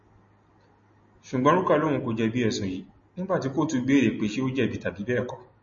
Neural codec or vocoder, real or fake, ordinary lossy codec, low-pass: none; real; MP3, 32 kbps; 7.2 kHz